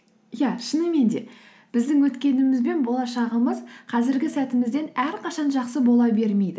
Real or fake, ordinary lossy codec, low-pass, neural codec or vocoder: real; none; none; none